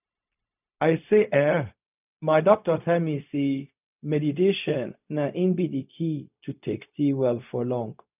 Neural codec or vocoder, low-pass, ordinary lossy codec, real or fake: codec, 16 kHz, 0.4 kbps, LongCat-Audio-Codec; 3.6 kHz; none; fake